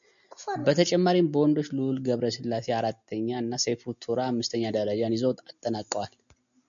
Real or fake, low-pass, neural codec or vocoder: real; 7.2 kHz; none